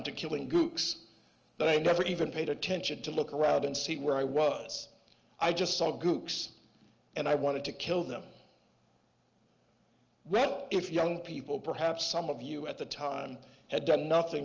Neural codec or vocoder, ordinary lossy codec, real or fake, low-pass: none; Opus, 24 kbps; real; 7.2 kHz